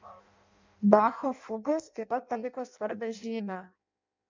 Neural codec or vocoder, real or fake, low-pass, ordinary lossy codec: codec, 16 kHz in and 24 kHz out, 0.6 kbps, FireRedTTS-2 codec; fake; 7.2 kHz; MP3, 64 kbps